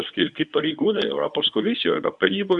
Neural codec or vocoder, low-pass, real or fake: codec, 24 kHz, 0.9 kbps, WavTokenizer, medium speech release version 1; 10.8 kHz; fake